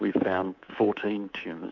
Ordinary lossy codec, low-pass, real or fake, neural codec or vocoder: MP3, 64 kbps; 7.2 kHz; fake; codec, 24 kHz, 3.1 kbps, DualCodec